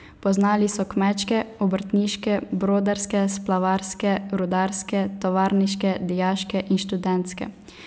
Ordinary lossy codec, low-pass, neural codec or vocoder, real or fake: none; none; none; real